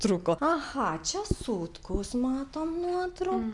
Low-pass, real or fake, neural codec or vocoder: 10.8 kHz; real; none